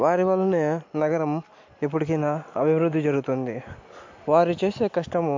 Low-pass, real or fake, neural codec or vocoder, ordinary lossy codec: 7.2 kHz; real; none; MP3, 48 kbps